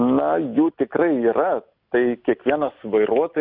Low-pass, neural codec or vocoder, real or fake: 5.4 kHz; none; real